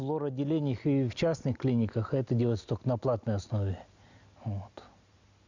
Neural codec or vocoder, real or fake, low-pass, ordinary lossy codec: none; real; 7.2 kHz; none